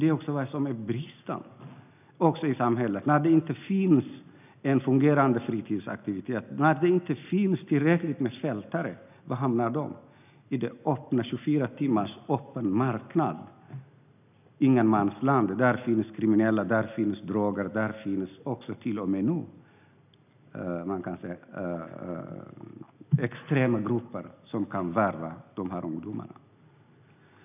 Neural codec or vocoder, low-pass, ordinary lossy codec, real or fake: none; 3.6 kHz; none; real